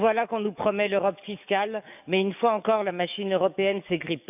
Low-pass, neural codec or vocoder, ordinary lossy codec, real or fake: 3.6 kHz; codec, 44.1 kHz, 7.8 kbps, DAC; none; fake